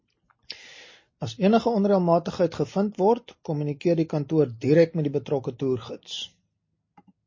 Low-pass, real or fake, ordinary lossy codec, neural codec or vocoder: 7.2 kHz; real; MP3, 32 kbps; none